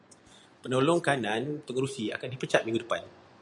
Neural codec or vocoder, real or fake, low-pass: vocoder, 24 kHz, 100 mel bands, Vocos; fake; 10.8 kHz